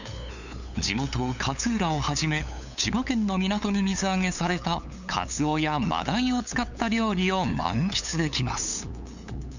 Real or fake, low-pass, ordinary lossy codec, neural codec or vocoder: fake; 7.2 kHz; none; codec, 16 kHz, 8 kbps, FunCodec, trained on LibriTTS, 25 frames a second